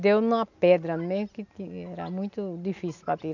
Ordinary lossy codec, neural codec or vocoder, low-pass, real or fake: none; none; 7.2 kHz; real